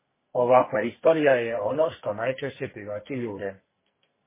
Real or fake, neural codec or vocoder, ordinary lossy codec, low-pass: fake; codec, 44.1 kHz, 2.6 kbps, SNAC; MP3, 16 kbps; 3.6 kHz